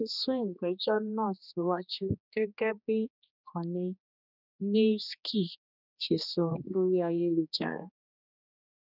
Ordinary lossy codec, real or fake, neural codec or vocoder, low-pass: none; fake; codec, 16 kHz, 2 kbps, X-Codec, HuBERT features, trained on general audio; 5.4 kHz